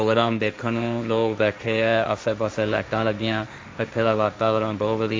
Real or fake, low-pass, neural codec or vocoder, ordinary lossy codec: fake; none; codec, 16 kHz, 1.1 kbps, Voila-Tokenizer; none